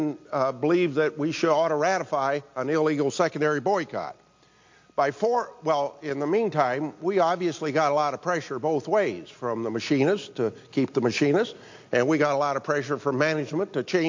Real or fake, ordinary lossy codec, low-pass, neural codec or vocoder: real; MP3, 48 kbps; 7.2 kHz; none